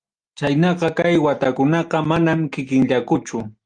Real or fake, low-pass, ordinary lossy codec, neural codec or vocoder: real; 9.9 kHz; Opus, 32 kbps; none